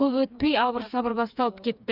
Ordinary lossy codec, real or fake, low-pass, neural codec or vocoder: none; fake; 5.4 kHz; codec, 16 kHz, 4 kbps, FreqCodec, smaller model